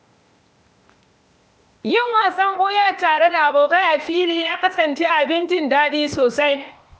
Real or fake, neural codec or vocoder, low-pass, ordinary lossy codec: fake; codec, 16 kHz, 0.8 kbps, ZipCodec; none; none